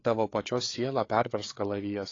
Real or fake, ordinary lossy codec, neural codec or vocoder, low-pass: fake; AAC, 32 kbps; codec, 16 kHz, 4 kbps, FreqCodec, larger model; 7.2 kHz